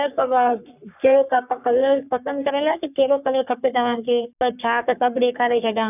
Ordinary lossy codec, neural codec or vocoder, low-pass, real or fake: none; codec, 44.1 kHz, 3.4 kbps, Pupu-Codec; 3.6 kHz; fake